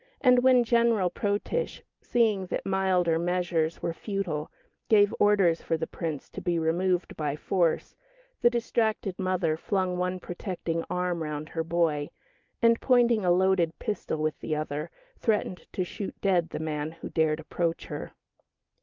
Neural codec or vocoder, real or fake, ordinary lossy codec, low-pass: none; real; Opus, 24 kbps; 7.2 kHz